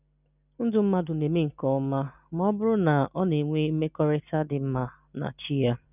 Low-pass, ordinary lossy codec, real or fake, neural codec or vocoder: 3.6 kHz; none; real; none